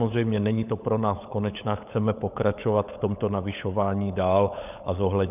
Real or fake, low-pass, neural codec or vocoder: fake; 3.6 kHz; codec, 16 kHz, 16 kbps, FunCodec, trained on LibriTTS, 50 frames a second